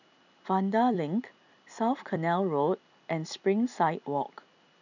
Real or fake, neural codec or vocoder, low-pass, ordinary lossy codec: fake; vocoder, 44.1 kHz, 128 mel bands every 256 samples, BigVGAN v2; 7.2 kHz; none